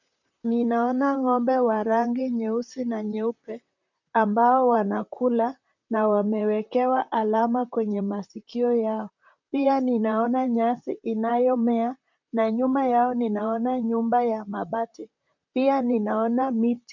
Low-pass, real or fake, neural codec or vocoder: 7.2 kHz; fake; vocoder, 44.1 kHz, 128 mel bands, Pupu-Vocoder